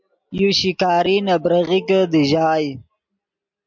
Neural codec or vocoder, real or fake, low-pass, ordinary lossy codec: none; real; 7.2 kHz; MP3, 64 kbps